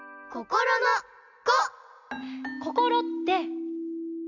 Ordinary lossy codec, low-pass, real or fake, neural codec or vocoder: none; 7.2 kHz; real; none